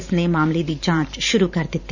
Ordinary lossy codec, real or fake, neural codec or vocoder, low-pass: none; fake; vocoder, 44.1 kHz, 128 mel bands every 512 samples, BigVGAN v2; 7.2 kHz